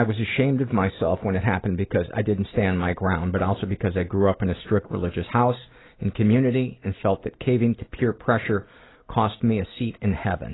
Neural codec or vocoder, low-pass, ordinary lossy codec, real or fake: none; 7.2 kHz; AAC, 16 kbps; real